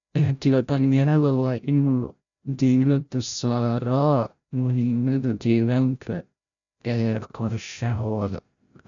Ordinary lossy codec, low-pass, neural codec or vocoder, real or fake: none; 7.2 kHz; codec, 16 kHz, 0.5 kbps, FreqCodec, larger model; fake